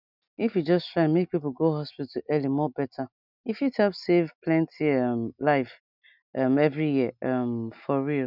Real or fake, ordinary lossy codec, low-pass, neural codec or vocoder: real; none; 5.4 kHz; none